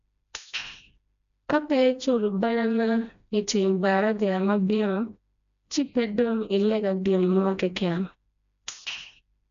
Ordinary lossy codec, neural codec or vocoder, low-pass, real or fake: none; codec, 16 kHz, 1 kbps, FreqCodec, smaller model; 7.2 kHz; fake